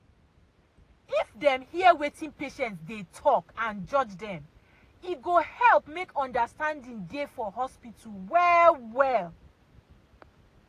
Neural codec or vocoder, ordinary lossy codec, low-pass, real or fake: none; AAC, 48 kbps; 14.4 kHz; real